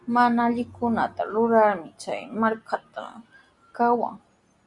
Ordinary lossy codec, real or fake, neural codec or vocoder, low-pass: Opus, 64 kbps; real; none; 10.8 kHz